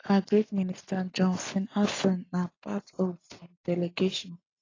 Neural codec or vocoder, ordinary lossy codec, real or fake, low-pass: none; AAC, 32 kbps; real; 7.2 kHz